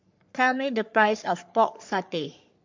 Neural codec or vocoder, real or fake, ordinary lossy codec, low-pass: codec, 44.1 kHz, 3.4 kbps, Pupu-Codec; fake; MP3, 48 kbps; 7.2 kHz